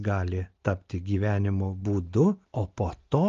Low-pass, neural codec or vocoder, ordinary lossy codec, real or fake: 7.2 kHz; none; Opus, 32 kbps; real